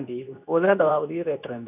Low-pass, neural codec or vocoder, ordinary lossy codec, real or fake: 3.6 kHz; codec, 24 kHz, 0.9 kbps, WavTokenizer, medium speech release version 2; none; fake